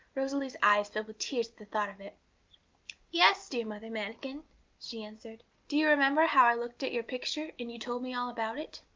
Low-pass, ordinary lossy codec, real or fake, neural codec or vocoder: 7.2 kHz; Opus, 32 kbps; real; none